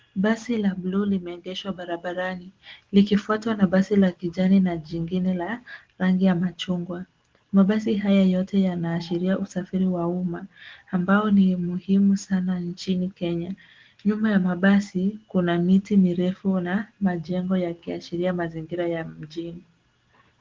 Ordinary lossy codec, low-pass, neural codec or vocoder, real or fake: Opus, 16 kbps; 7.2 kHz; none; real